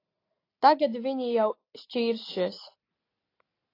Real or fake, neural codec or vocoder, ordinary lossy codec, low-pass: real; none; AAC, 24 kbps; 5.4 kHz